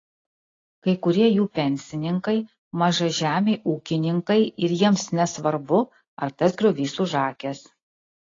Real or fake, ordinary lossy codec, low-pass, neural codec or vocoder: real; AAC, 32 kbps; 7.2 kHz; none